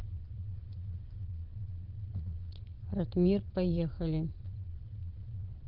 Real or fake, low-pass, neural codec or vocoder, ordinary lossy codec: fake; 5.4 kHz; vocoder, 22.05 kHz, 80 mel bands, Vocos; Opus, 32 kbps